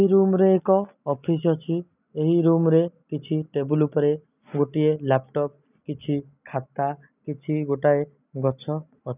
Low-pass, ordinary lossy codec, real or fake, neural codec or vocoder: 3.6 kHz; none; real; none